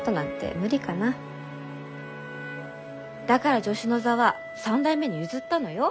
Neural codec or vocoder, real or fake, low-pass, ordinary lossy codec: none; real; none; none